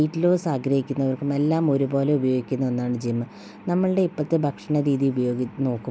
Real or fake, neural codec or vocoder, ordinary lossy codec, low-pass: real; none; none; none